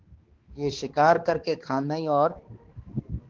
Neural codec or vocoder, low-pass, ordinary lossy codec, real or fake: codec, 16 kHz, 2 kbps, X-Codec, HuBERT features, trained on balanced general audio; 7.2 kHz; Opus, 16 kbps; fake